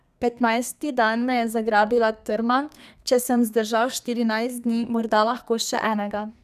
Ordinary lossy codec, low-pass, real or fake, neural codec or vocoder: none; 14.4 kHz; fake; codec, 32 kHz, 1.9 kbps, SNAC